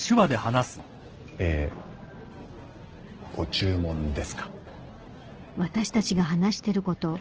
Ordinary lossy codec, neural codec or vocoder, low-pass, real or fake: Opus, 16 kbps; none; 7.2 kHz; real